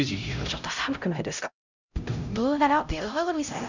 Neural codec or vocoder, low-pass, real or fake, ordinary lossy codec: codec, 16 kHz, 0.5 kbps, X-Codec, HuBERT features, trained on LibriSpeech; 7.2 kHz; fake; none